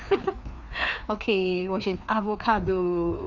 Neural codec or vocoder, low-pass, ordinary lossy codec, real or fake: codec, 16 kHz, 2 kbps, FreqCodec, larger model; 7.2 kHz; none; fake